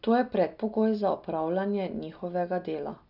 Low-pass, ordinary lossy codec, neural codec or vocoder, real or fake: 5.4 kHz; none; none; real